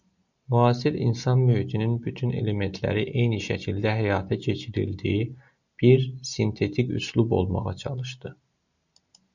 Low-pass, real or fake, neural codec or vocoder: 7.2 kHz; real; none